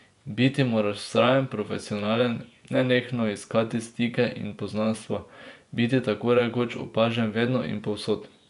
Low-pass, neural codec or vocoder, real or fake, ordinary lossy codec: 10.8 kHz; vocoder, 24 kHz, 100 mel bands, Vocos; fake; none